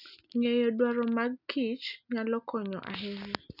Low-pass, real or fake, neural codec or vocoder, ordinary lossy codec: 5.4 kHz; real; none; none